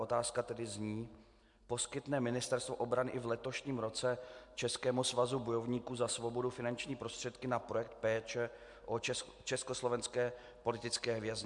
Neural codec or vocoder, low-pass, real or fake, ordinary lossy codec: none; 10.8 kHz; real; MP3, 64 kbps